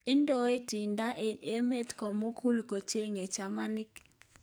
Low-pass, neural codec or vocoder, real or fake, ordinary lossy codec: none; codec, 44.1 kHz, 2.6 kbps, SNAC; fake; none